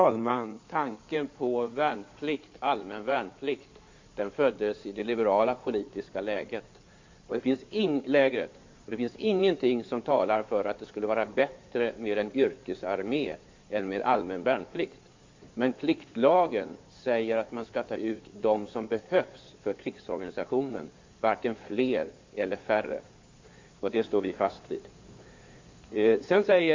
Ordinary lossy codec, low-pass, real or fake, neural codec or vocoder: MP3, 48 kbps; 7.2 kHz; fake; codec, 16 kHz in and 24 kHz out, 2.2 kbps, FireRedTTS-2 codec